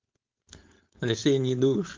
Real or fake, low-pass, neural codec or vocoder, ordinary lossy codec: fake; 7.2 kHz; codec, 16 kHz, 4.8 kbps, FACodec; Opus, 24 kbps